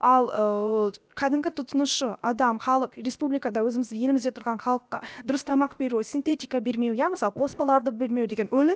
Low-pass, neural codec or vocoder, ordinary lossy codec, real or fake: none; codec, 16 kHz, about 1 kbps, DyCAST, with the encoder's durations; none; fake